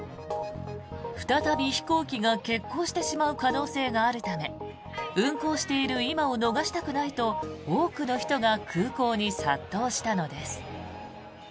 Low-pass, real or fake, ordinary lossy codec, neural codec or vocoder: none; real; none; none